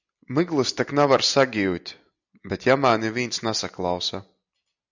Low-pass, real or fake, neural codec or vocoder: 7.2 kHz; real; none